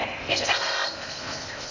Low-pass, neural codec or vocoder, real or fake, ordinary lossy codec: 7.2 kHz; codec, 16 kHz in and 24 kHz out, 0.6 kbps, FocalCodec, streaming, 2048 codes; fake; none